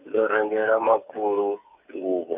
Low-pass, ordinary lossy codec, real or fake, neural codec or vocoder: 3.6 kHz; AAC, 32 kbps; fake; codec, 16 kHz, 8 kbps, FreqCodec, smaller model